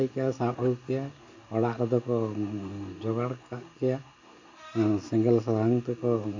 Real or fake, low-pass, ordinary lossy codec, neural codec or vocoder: real; 7.2 kHz; AAC, 48 kbps; none